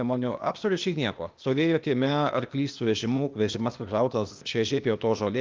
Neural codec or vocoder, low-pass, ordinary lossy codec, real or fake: codec, 16 kHz, 0.8 kbps, ZipCodec; 7.2 kHz; Opus, 32 kbps; fake